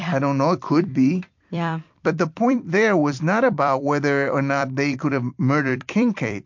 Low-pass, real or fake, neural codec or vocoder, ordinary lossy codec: 7.2 kHz; fake; vocoder, 44.1 kHz, 128 mel bands every 256 samples, BigVGAN v2; MP3, 48 kbps